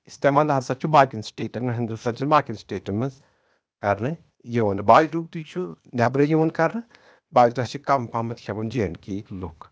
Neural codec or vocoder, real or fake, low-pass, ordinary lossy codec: codec, 16 kHz, 0.8 kbps, ZipCodec; fake; none; none